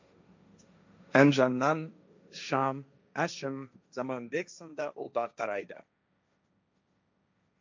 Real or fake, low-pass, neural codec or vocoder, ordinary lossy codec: fake; 7.2 kHz; codec, 16 kHz, 1.1 kbps, Voila-Tokenizer; MP3, 64 kbps